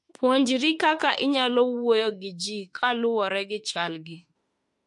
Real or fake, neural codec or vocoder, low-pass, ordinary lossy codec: fake; autoencoder, 48 kHz, 32 numbers a frame, DAC-VAE, trained on Japanese speech; 10.8 kHz; MP3, 48 kbps